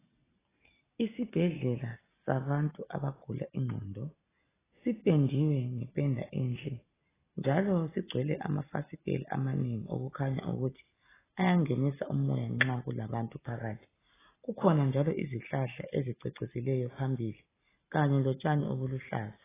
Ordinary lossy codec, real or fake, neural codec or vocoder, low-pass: AAC, 16 kbps; real; none; 3.6 kHz